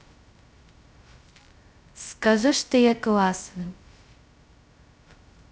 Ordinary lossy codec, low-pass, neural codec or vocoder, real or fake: none; none; codec, 16 kHz, 0.2 kbps, FocalCodec; fake